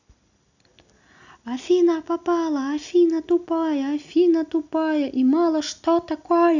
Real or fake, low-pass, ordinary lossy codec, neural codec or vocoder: real; 7.2 kHz; none; none